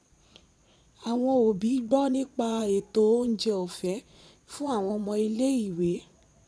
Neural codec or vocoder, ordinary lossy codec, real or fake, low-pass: vocoder, 22.05 kHz, 80 mel bands, Vocos; none; fake; none